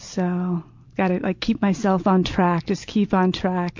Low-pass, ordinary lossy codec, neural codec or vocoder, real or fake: 7.2 kHz; MP3, 48 kbps; none; real